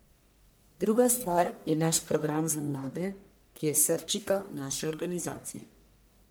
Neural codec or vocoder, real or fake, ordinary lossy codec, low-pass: codec, 44.1 kHz, 1.7 kbps, Pupu-Codec; fake; none; none